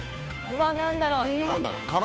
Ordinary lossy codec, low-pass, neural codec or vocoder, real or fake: none; none; codec, 16 kHz, 2 kbps, FunCodec, trained on Chinese and English, 25 frames a second; fake